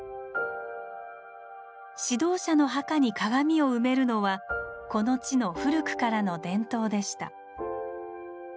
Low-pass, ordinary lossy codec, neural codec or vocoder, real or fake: none; none; none; real